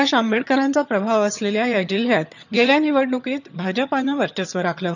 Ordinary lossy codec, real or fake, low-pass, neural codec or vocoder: none; fake; 7.2 kHz; vocoder, 22.05 kHz, 80 mel bands, HiFi-GAN